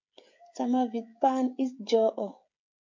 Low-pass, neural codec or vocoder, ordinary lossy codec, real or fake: 7.2 kHz; codec, 16 kHz, 16 kbps, FreqCodec, smaller model; MP3, 48 kbps; fake